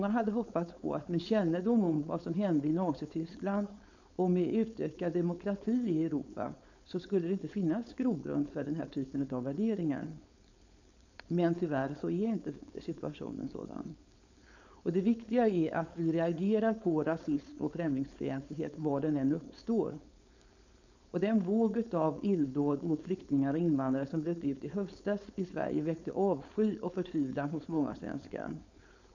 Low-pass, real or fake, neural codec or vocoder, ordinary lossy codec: 7.2 kHz; fake; codec, 16 kHz, 4.8 kbps, FACodec; none